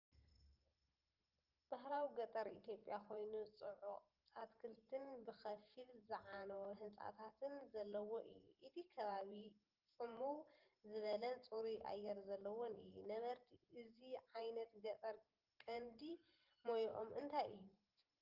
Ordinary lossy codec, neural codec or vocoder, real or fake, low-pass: Opus, 24 kbps; vocoder, 44.1 kHz, 128 mel bands every 512 samples, BigVGAN v2; fake; 5.4 kHz